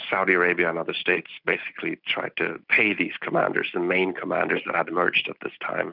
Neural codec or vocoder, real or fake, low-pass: none; real; 5.4 kHz